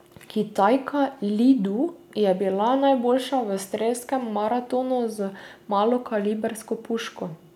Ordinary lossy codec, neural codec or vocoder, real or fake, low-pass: none; none; real; 19.8 kHz